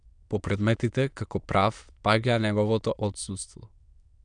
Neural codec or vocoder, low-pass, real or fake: autoencoder, 22.05 kHz, a latent of 192 numbers a frame, VITS, trained on many speakers; 9.9 kHz; fake